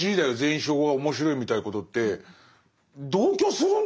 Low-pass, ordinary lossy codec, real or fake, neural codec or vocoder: none; none; real; none